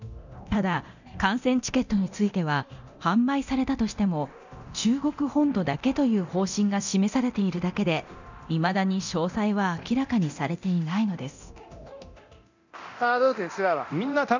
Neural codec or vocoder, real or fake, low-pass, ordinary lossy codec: codec, 24 kHz, 0.9 kbps, DualCodec; fake; 7.2 kHz; none